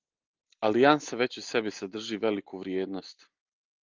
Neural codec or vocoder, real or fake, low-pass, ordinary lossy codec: none; real; 7.2 kHz; Opus, 24 kbps